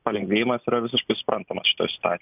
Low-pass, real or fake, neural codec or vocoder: 3.6 kHz; real; none